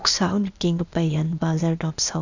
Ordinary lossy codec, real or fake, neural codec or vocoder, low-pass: none; fake; codec, 16 kHz, 0.8 kbps, ZipCodec; 7.2 kHz